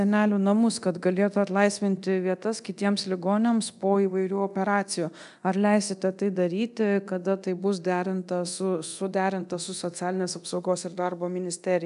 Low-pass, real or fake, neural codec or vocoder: 10.8 kHz; fake; codec, 24 kHz, 0.9 kbps, DualCodec